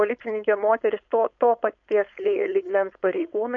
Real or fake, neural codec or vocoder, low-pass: fake; codec, 16 kHz, 4.8 kbps, FACodec; 7.2 kHz